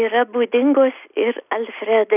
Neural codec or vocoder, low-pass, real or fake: none; 3.6 kHz; real